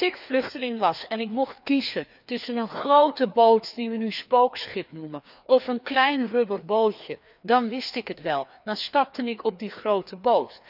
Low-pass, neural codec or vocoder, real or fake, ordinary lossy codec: 5.4 kHz; codec, 16 kHz, 2 kbps, FreqCodec, larger model; fake; none